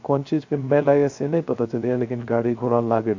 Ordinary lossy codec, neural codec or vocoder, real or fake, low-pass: AAC, 48 kbps; codec, 16 kHz, 0.3 kbps, FocalCodec; fake; 7.2 kHz